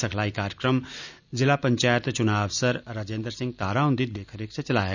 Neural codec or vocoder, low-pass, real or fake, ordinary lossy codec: none; 7.2 kHz; real; none